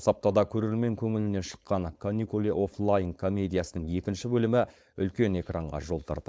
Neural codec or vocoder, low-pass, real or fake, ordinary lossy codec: codec, 16 kHz, 4.8 kbps, FACodec; none; fake; none